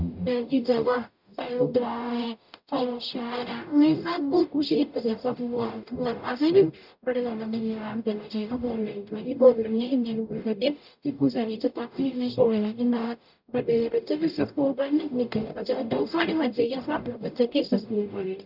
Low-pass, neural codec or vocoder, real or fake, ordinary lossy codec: 5.4 kHz; codec, 44.1 kHz, 0.9 kbps, DAC; fake; none